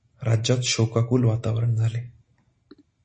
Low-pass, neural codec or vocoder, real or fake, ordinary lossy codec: 9.9 kHz; none; real; MP3, 32 kbps